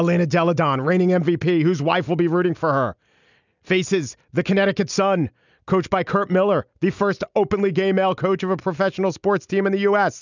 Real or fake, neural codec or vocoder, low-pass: real; none; 7.2 kHz